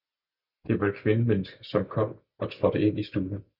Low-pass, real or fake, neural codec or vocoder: 5.4 kHz; real; none